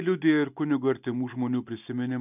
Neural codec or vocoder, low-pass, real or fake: none; 3.6 kHz; real